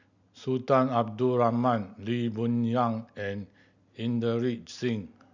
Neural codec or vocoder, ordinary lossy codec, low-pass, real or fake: none; none; 7.2 kHz; real